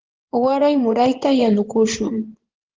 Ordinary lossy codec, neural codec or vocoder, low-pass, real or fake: Opus, 16 kbps; codec, 16 kHz in and 24 kHz out, 2.2 kbps, FireRedTTS-2 codec; 7.2 kHz; fake